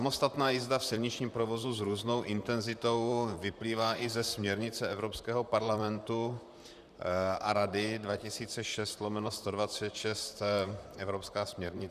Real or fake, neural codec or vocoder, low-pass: fake; vocoder, 44.1 kHz, 128 mel bands, Pupu-Vocoder; 14.4 kHz